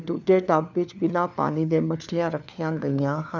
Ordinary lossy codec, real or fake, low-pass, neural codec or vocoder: none; fake; 7.2 kHz; codec, 16 kHz, 4 kbps, FunCodec, trained on LibriTTS, 50 frames a second